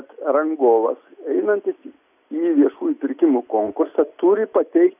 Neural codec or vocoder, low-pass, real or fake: none; 3.6 kHz; real